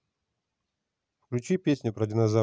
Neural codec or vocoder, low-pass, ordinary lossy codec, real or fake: none; none; none; real